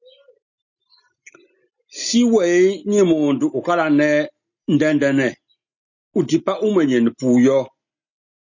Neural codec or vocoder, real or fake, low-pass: none; real; 7.2 kHz